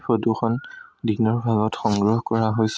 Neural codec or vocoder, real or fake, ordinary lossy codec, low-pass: none; real; none; none